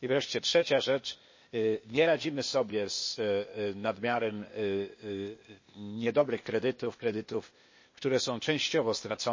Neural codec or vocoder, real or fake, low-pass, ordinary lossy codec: codec, 16 kHz, 0.8 kbps, ZipCodec; fake; 7.2 kHz; MP3, 32 kbps